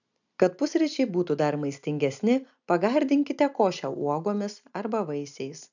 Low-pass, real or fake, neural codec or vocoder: 7.2 kHz; real; none